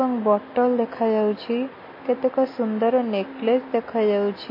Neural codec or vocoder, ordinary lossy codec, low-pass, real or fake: none; MP3, 24 kbps; 5.4 kHz; real